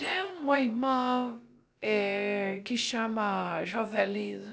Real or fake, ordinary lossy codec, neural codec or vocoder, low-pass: fake; none; codec, 16 kHz, about 1 kbps, DyCAST, with the encoder's durations; none